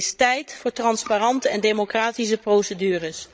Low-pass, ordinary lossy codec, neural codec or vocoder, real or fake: none; none; codec, 16 kHz, 16 kbps, FreqCodec, larger model; fake